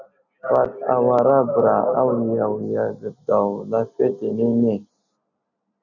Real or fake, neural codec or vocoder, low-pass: real; none; 7.2 kHz